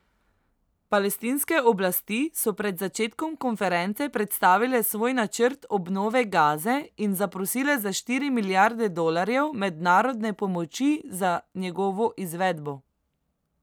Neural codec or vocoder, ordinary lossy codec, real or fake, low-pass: none; none; real; none